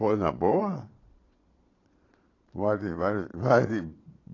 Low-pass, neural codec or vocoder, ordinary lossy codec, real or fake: 7.2 kHz; vocoder, 22.05 kHz, 80 mel bands, Vocos; AAC, 32 kbps; fake